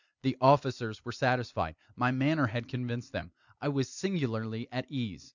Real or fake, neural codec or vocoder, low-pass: real; none; 7.2 kHz